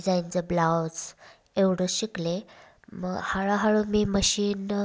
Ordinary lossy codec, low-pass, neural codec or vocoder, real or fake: none; none; none; real